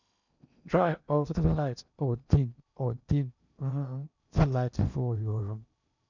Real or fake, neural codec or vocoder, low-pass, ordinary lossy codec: fake; codec, 16 kHz in and 24 kHz out, 0.8 kbps, FocalCodec, streaming, 65536 codes; 7.2 kHz; none